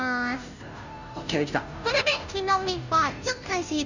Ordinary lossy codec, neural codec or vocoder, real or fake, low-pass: none; codec, 16 kHz, 0.5 kbps, FunCodec, trained on Chinese and English, 25 frames a second; fake; 7.2 kHz